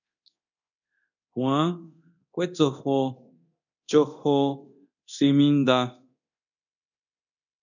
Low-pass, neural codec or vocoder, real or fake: 7.2 kHz; codec, 24 kHz, 0.9 kbps, DualCodec; fake